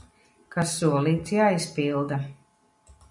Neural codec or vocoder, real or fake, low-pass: none; real; 10.8 kHz